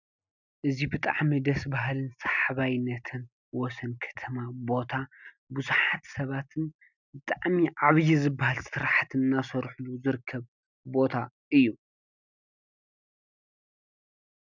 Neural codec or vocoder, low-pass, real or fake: none; 7.2 kHz; real